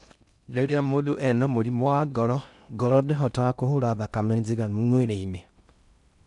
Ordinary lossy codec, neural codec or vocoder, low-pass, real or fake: none; codec, 16 kHz in and 24 kHz out, 0.6 kbps, FocalCodec, streaming, 4096 codes; 10.8 kHz; fake